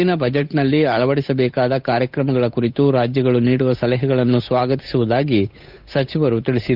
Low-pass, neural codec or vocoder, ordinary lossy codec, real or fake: 5.4 kHz; codec, 16 kHz, 8 kbps, FunCodec, trained on Chinese and English, 25 frames a second; none; fake